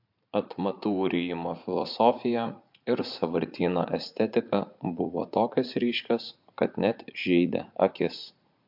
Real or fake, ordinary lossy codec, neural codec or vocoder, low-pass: fake; MP3, 48 kbps; vocoder, 44.1 kHz, 80 mel bands, Vocos; 5.4 kHz